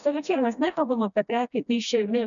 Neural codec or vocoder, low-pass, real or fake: codec, 16 kHz, 1 kbps, FreqCodec, smaller model; 7.2 kHz; fake